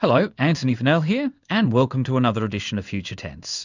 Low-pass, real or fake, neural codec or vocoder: 7.2 kHz; fake; codec, 16 kHz in and 24 kHz out, 1 kbps, XY-Tokenizer